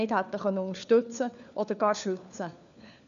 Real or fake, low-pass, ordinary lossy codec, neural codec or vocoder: fake; 7.2 kHz; none; codec, 16 kHz, 4 kbps, FunCodec, trained on LibriTTS, 50 frames a second